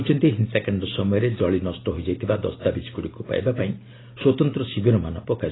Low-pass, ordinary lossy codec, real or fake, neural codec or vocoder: 7.2 kHz; AAC, 16 kbps; real; none